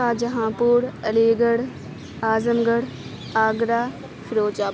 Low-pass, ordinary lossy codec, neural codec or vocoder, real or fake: none; none; none; real